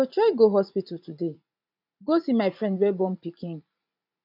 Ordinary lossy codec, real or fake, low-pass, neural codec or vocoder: none; fake; 5.4 kHz; vocoder, 44.1 kHz, 80 mel bands, Vocos